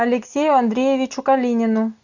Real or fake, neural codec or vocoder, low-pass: real; none; 7.2 kHz